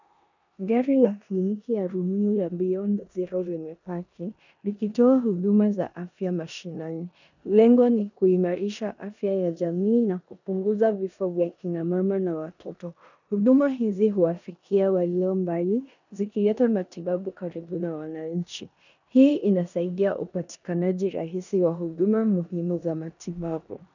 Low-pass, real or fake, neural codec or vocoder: 7.2 kHz; fake; codec, 16 kHz in and 24 kHz out, 0.9 kbps, LongCat-Audio-Codec, four codebook decoder